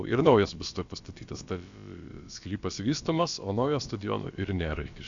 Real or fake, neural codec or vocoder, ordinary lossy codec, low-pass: fake; codec, 16 kHz, about 1 kbps, DyCAST, with the encoder's durations; Opus, 64 kbps; 7.2 kHz